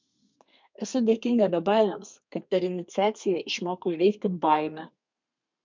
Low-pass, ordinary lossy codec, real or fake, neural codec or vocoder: 7.2 kHz; MP3, 64 kbps; fake; codec, 32 kHz, 1.9 kbps, SNAC